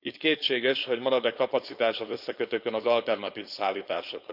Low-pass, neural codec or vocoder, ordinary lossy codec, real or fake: 5.4 kHz; codec, 16 kHz, 4.8 kbps, FACodec; none; fake